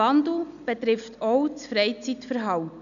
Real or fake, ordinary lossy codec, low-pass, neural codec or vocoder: real; none; 7.2 kHz; none